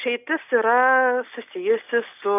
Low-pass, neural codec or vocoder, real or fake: 3.6 kHz; none; real